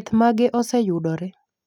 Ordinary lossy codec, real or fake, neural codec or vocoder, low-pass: none; real; none; 19.8 kHz